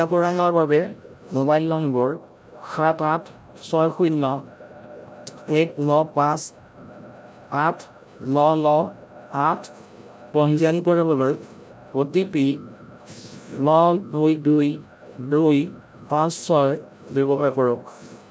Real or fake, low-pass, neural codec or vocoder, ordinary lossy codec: fake; none; codec, 16 kHz, 0.5 kbps, FreqCodec, larger model; none